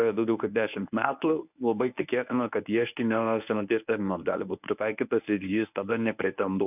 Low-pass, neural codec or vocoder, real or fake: 3.6 kHz; codec, 24 kHz, 0.9 kbps, WavTokenizer, medium speech release version 1; fake